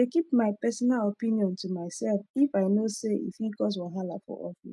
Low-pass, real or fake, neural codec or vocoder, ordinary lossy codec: none; real; none; none